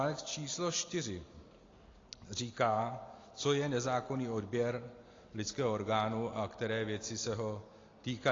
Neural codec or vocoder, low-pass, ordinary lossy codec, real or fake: none; 7.2 kHz; AAC, 32 kbps; real